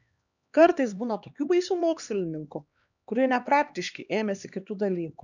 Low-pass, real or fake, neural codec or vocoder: 7.2 kHz; fake; codec, 16 kHz, 2 kbps, X-Codec, HuBERT features, trained on LibriSpeech